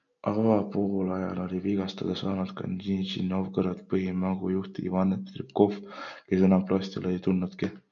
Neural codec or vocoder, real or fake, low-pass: none; real; 7.2 kHz